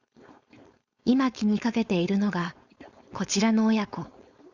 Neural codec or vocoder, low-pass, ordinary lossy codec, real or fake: codec, 16 kHz, 4.8 kbps, FACodec; 7.2 kHz; Opus, 64 kbps; fake